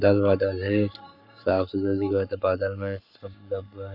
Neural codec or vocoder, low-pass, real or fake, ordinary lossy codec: codec, 44.1 kHz, 7.8 kbps, Pupu-Codec; 5.4 kHz; fake; none